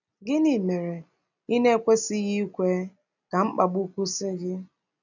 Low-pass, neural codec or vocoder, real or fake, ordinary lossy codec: 7.2 kHz; none; real; none